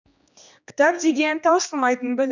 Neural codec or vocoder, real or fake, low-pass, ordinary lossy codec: codec, 16 kHz, 2 kbps, X-Codec, HuBERT features, trained on general audio; fake; 7.2 kHz; none